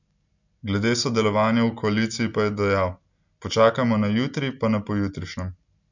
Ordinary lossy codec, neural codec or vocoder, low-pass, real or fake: none; none; 7.2 kHz; real